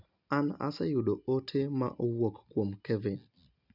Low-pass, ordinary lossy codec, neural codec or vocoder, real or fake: 5.4 kHz; none; none; real